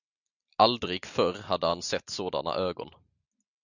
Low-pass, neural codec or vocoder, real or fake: 7.2 kHz; none; real